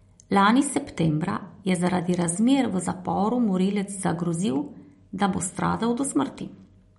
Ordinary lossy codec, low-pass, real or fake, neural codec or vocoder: MP3, 48 kbps; 14.4 kHz; real; none